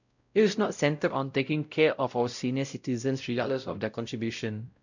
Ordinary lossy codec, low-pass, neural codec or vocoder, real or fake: none; 7.2 kHz; codec, 16 kHz, 0.5 kbps, X-Codec, WavLM features, trained on Multilingual LibriSpeech; fake